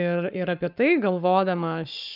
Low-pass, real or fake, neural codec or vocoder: 5.4 kHz; fake; codec, 44.1 kHz, 7.8 kbps, Pupu-Codec